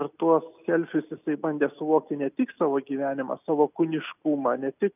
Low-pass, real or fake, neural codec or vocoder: 3.6 kHz; real; none